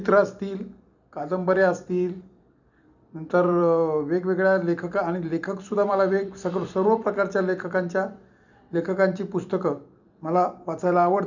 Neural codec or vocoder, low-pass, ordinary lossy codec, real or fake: vocoder, 44.1 kHz, 128 mel bands every 256 samples, BigVGAN v2; 7.2 kHz; none; fake